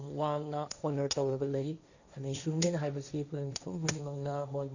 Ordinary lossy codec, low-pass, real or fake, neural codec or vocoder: none; 7.2 kHz; fake; codec, 16 kHz, 1.1 kbps, Voila-Tokenizer